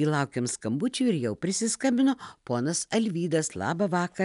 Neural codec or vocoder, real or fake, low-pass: none; real; 10.8 kHz